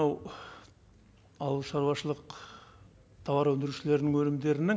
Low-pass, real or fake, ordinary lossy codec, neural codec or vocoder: none; real; none; none